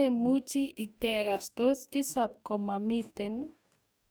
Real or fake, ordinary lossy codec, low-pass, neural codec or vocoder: fake; none; none; codec, 44.1 kHz, 2.6 kbps, DAC